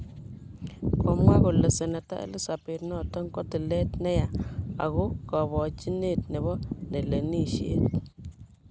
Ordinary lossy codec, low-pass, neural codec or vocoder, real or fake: none; none; none; real